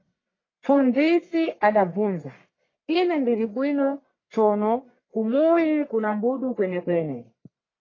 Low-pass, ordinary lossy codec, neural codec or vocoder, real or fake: 7.2 kHz; AAC, 32 kbps; codec, 44.1 kHz, 1.7 kbps, Pupu-Codec; fake